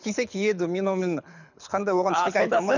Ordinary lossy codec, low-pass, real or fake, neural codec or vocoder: none; 7.2 kHz; fake; codec, 24 kHz, 6 kbps, HILCodec